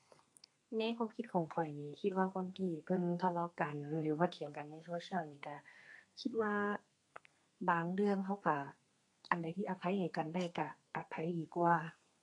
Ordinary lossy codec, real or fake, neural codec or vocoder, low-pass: AAC, 48 kbps; fake; codec, 32 kHz, 1.9 kbps, SNAC; 10.8 kHz